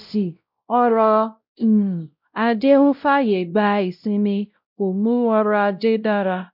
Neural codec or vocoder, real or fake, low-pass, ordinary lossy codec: codec, 16 kHz, 0.5 kbps, X-Codec, WavLM features, trained on Multilingual LibriSpeech; fake; 5.4 kHz; none